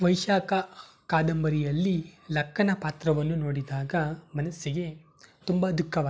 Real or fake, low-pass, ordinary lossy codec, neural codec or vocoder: real; none; none; none